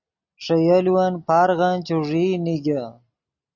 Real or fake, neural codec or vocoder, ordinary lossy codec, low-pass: real; none; Opus, 64 kbps; 7.2 kHz